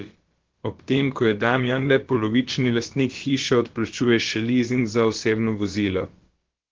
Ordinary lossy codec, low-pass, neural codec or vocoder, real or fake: Opus, 16 kbps; 7.2 kHz; codec, 16 kHz, about 1 kbps, DyCAST, with the encoder's durations; fake